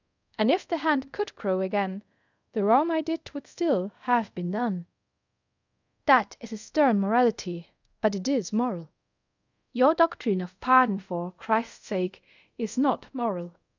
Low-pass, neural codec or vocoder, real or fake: 7.2 kHz; codec, 24 kHz, 0.5 kbps, DualCodec; fake